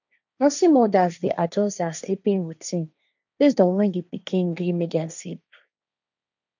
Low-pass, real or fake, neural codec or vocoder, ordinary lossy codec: 7.2 kHz; fake; codec, 16 kHz, 1.1 kbps, Voila-Tokenizer; none